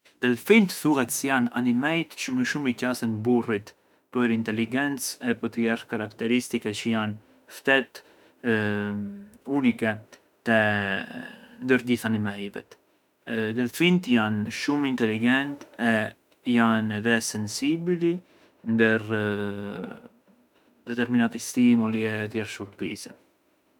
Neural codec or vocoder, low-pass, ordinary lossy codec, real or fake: autoencoder, 48 kHz, 32 numbers a frame, DAC-VAE, trained on Japanese speech; 19.8 kHz; none; fake